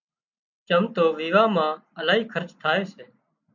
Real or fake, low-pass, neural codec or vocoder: real; 7.2 kHz; none